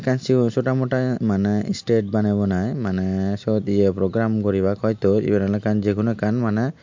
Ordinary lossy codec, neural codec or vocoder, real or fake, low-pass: MP3, 48 kbps; none; real; 7.2 kHz